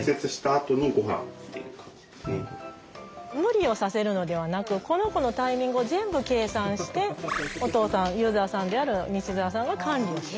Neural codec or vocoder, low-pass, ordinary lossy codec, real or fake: none; none; none; real